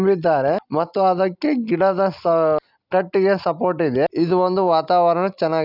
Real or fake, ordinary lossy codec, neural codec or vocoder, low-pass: real; none; none; 5.4 kHz